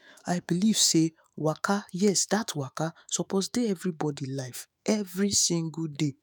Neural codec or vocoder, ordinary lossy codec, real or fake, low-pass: autoencoder, 48 kHz, 128 numbers a frame, DAC-VAE, trained on Japanese speech; none; fake; none